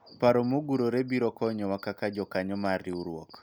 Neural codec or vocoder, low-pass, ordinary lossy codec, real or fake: none; none; none; real